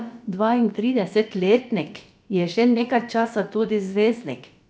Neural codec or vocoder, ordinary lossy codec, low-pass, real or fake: codec, 16 kHz, about 1 kbps, DyCAST, with the encoder's durations; none; none; fake